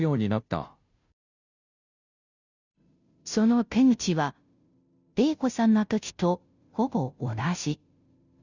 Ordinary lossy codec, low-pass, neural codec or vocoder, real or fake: none; 7.2 kHz; codec, 16 kHz, 0.5 kbps, FunCodec, trained on Chinese and English, 25 frames a second; fake